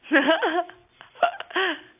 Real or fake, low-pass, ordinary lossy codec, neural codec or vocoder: real; 3.6 kHz; AAC, 32 kbps; none